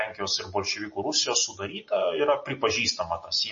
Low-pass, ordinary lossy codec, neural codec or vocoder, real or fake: 7.2 kHz; MP3, 32 kbps; none; real